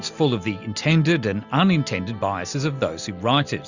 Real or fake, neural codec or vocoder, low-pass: real; none; 7.2 kHz